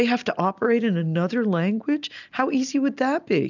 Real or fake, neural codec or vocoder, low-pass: real; none; 7.2 kHz